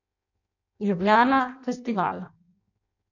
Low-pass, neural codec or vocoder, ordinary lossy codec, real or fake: 7.2 kHz; codec, 16 kHz in and 24 kHz out, 0.6 kbps, FireRedTTS-2 codec; none; fake